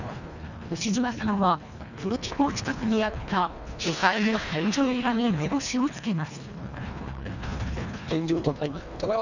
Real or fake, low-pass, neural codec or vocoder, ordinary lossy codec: fake; 7.2 kHz; codec, 24 kHz, 1.5 kbps, HILCodec; none